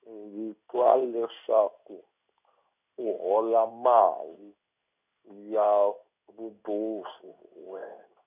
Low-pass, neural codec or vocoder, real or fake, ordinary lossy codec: 3.6 kHz; codec, 16 kHz in and 24 kHz out, 1 kbps, XY-Tokenizer; fake; none